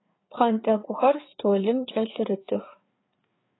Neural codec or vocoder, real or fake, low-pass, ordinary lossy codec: codec, 16 kHz, 4 kbps, FreqCodec, larger model; fake; 7.2 kHz; AAC, 16 kbps